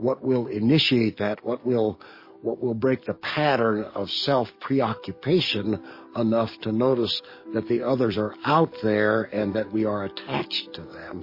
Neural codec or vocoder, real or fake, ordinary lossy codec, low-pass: codec, 44.1 kHz, 7.8 kbps, DAC; fake; MP3, 24 kbps; 5.4 kHz